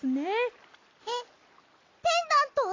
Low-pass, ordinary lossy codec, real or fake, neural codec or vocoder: 7.2 kHz; AAC, 32 kbps; real; none